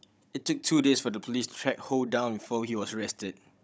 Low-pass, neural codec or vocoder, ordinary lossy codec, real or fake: none; codec, 16 kHz, 16 kbps, FunCodec, trained on Chinese and English, 50 frames a second; none; fake